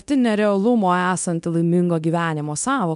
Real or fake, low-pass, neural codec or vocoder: fake; 10.8 kHz; codec, 24 kHz, 0.9 kbps, DualCodec